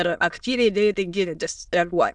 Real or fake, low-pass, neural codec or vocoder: fake; 9.9 kHz; autoencoder, 22.05 kHz, a latent of 192 numbers a frame, VITS, trained on many speakers